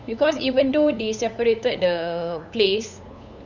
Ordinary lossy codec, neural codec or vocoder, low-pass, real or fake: none; codec, 16 kHz, 8 kbps, FunCodec, trained on LibriTTS, 25 frames a second; 7.2 kHz; fake